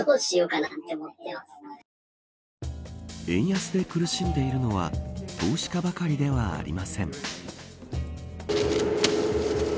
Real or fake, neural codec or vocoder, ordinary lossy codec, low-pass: real; none; none; none